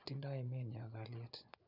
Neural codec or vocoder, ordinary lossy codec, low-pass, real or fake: vocoder, 24 kHz, 100 mel bands, Vocos; none; 5.4 kHz; fake